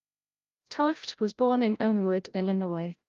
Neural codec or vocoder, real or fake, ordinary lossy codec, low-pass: codec, 16 kHz, 0.5 kbps, FreqCodec, larger model; fake; Opus, 32 kbps; 7.2 kHz